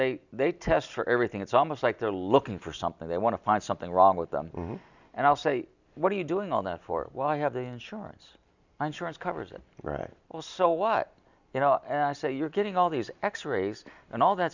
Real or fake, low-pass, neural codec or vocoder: real; 7.2 kHz; none